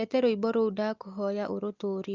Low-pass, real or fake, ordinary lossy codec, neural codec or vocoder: 7.2 kHz; real; Opus, 32 kbps; none